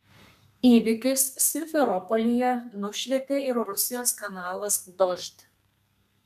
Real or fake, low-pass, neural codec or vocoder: fake; 14.4 kHz; codec, 32 kHz, 1.9 kbps, SNAC